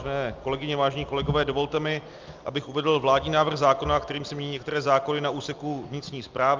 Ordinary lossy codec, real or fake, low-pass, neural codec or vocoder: Opus, 24 kbps; real; 7.2 kHz; none